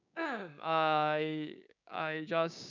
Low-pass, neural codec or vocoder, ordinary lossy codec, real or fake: 7.2 kHz; codec, 16 kHz, 6 kbps, DAC; none; fake